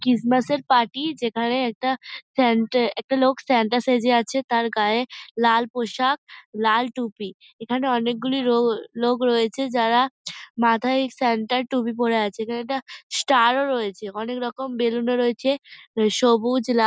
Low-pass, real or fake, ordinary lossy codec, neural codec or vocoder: none; real; none; none